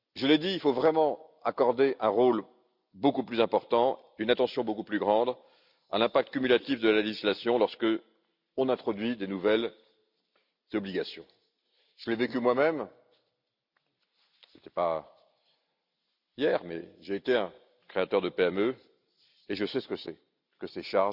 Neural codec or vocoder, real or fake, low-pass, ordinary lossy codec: none; real; 5.4 kHz; Opus, 64 kbps